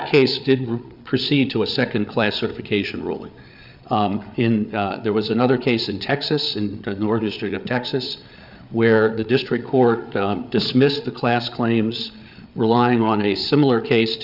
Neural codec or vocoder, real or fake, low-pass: codec, 16 kHz, 8 kbps, FreqCodec, larger model; fake; 5.4 kHz